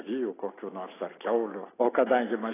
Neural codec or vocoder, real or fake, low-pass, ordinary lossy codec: none; real; 3.6 kHz; AAC, 16 kbps